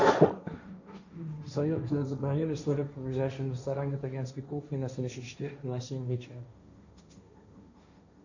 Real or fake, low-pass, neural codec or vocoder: fake; 7.2 kHz; codec, 16 kHz, 1.1 kbps, Voila-Tokenizer